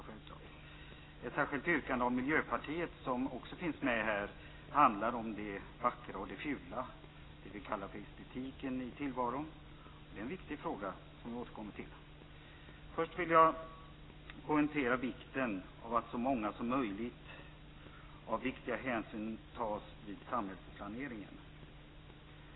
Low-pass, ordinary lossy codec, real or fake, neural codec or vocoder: 7.2 kHz; AAC, 16 kbps; real; none